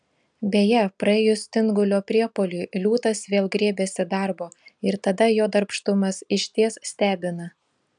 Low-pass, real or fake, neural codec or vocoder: 9.9 kHz; real; none